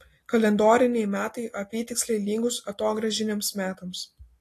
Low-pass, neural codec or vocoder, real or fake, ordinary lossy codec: 14.4 kHz; none; real; AAC, 48 kbps